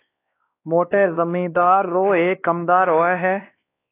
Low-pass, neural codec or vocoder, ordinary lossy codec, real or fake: 3.6 kHz; codec, 16 kHz, 2 kbps, X-Codec, WavLM features, trained on Multilingual LibriSpeech; AAC, 24 kbps; fake